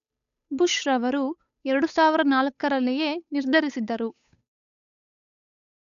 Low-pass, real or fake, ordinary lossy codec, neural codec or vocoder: 7.2 kHz; fake; none; codec, 16 kHz, 8 kbps, FunCodec, trained on Chinese and English, 25 frames a second